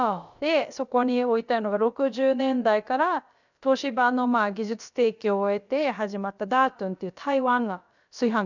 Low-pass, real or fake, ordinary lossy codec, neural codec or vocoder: 7.2 kHz; fake; none; codec, 16 kHz, about 1 kbps, DyCAST, with the encoder's durations